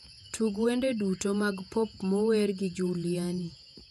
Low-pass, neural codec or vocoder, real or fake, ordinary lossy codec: 14.4 kHz; vocoder, 48 kHz, 128 mel bands, Vocos; fake; none